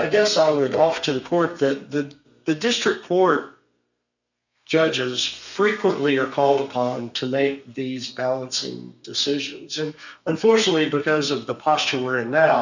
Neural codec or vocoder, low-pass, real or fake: codec, 32 kHz, 1.9 kbps, SNAC; 7.2 kHz; fake